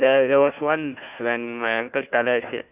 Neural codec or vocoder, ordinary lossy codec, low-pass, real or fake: codec, 16 kHz, 1 kbps, FunCodec, trained on Chinese and English, 50 frames a second; none; 3.6 kHz; fake